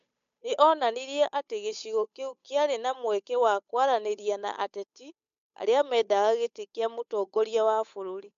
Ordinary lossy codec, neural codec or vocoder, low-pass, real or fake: MP3, 64 kbps; codec, 16 kHz, 8 kbps, FunCodec, trained on Chinese and English, 25 frames a second; 7.2 kHz; fake